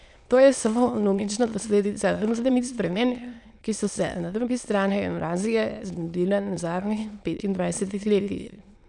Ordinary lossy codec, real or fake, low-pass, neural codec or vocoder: none; fake; 9.9 kHz; autoencoder, 22.05 kHz, a latent of 192 numbers a frame, VITS, trained on many speakers